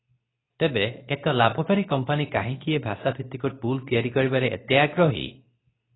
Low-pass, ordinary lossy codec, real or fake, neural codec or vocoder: 7.2 kHz; AAC, 16 kbps; fake; codec, 24 kHz, 0.9 kbps, WavTokenizer, medium speech release version 2